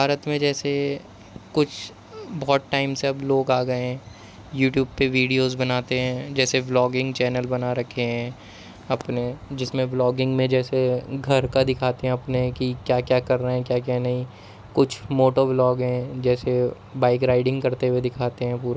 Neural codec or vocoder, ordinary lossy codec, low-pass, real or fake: none; none; none; real